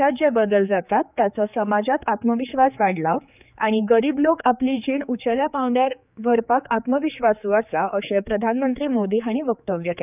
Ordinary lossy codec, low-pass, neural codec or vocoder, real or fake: none; 3.6 kHz; codec, 16 kHz, 4 kbps, X-Codec, HuBERT features, trained on general audio; fake